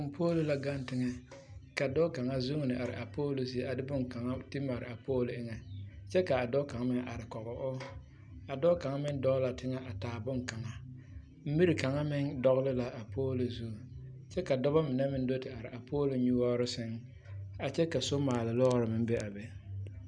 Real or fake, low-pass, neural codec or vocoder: real; 9.9 kHz; none